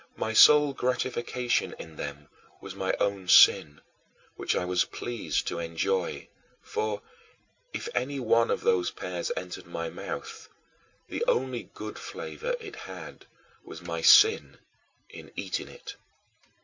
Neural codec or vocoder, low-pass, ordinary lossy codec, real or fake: none; 7.2 kHz; MP3, 64 kbps; real